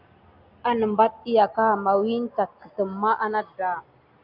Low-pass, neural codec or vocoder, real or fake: 5.4 kHz; none; real